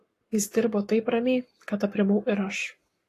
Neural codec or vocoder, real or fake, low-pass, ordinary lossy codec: codec, 44.1 kHz, 7.8 kbps, Pupu-Codec; fake; 14.4 kHz; AAC, 48 kbps